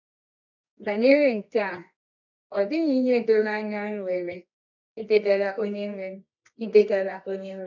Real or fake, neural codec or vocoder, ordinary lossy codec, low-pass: fake; codec, 24 kHz, 0.9 kbps, WavTokenizer, medium music audio release; none; 7.2 kHz